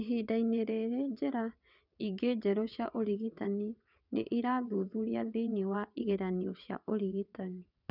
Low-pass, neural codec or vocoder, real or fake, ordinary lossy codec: 5.4 kHz; vocoder, 22.05 kHz, 80 mel bands, WaveNeXt; fake; none